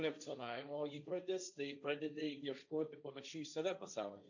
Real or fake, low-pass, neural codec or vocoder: fake; 7.2 kHz; codec, 16 kHz, 1.1 kbps, Voila-Tokenizer